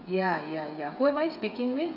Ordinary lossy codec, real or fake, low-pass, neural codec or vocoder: none; fake; 5.4 kHz; codec, 16 kHz, 8 kbps, FreqCodec, smaller model